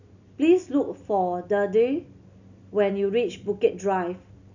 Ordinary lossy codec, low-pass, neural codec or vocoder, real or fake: none; 7.2 kHz; none; real